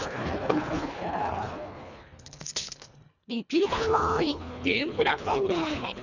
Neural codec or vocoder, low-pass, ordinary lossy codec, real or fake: codec, 24 kHz, 1.5 kbps, HILCodec; 7.2 kHz; none; fake